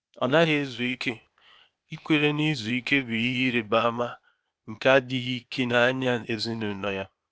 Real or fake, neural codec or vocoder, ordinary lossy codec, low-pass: fake; codec, 16 kHz, 0.8 kbps, ZipCodec; none; none